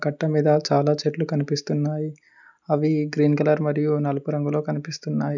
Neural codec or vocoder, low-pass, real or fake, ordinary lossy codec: none; 7.2 kHz; real; none